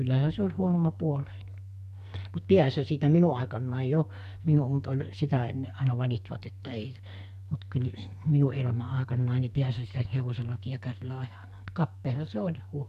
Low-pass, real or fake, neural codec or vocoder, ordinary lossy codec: 14.4 kHz; fake; codec, 44.1 kHz, 2.6 kbps, SNAC; none